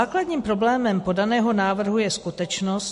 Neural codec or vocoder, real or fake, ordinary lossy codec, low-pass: none; real; MP3, 48 kbps; 14.4 kHz